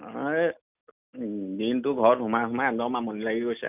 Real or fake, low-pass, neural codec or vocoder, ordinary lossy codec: real; 3.6 kHz; none; none